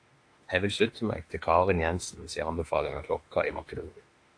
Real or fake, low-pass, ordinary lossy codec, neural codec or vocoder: fake; 9.9 kHz; AAC, 48 kbps; autoencoder, 48 kHz, 32 numbers a frame, DAC-VAE, trained on Japanese speech